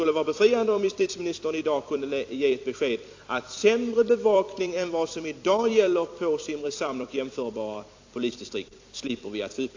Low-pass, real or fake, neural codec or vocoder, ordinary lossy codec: 7.2 kHz; fake; vocoder, 44.1 kHz, 128 mel bands every 256 samples, BigVGAN v2; AAC, 48 kbps